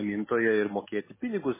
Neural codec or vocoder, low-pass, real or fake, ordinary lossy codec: none; 3.6 kHz; real; MP3, 16 kbps